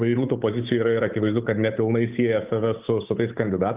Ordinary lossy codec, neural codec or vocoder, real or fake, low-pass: Opus, 24 kbps; codec, 16 kHz, 8 kbps, FunCodec, trained on Chinese and English, 25 frames a second; fake; 3.6 kHz